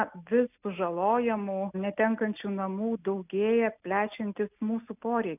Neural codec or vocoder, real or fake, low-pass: none; real; 3.6 kHz